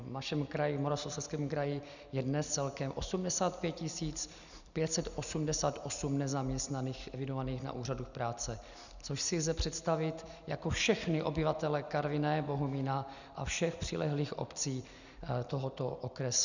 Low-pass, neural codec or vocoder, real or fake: 7.2 kHz; none; real